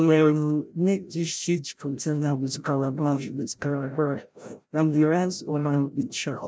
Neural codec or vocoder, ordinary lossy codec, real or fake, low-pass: codec, 16 kHz, 0.5 kbps, FreqCodec, larger model; none; fake; none